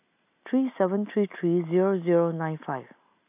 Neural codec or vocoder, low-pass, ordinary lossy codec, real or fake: none; 3.6 kHz; AAC, 32 kbps; real